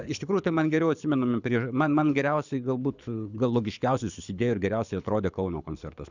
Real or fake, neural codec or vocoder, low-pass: fake; codec, 24 kHz, 6 kbps, HILCodec; 7.2 kHz